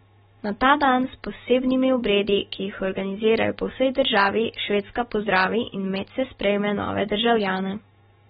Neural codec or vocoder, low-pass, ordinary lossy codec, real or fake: none; 19.8 kHz; AAC, 16 kbps; real